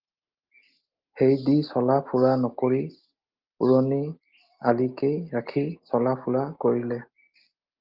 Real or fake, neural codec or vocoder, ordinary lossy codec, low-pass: real; none; Opus, 24 kbps; 5.4 kHz